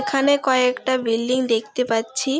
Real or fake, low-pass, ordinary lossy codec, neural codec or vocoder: real; none; none; none